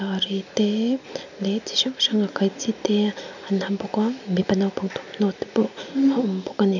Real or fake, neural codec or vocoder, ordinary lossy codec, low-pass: real; none; none; 7.2 kHz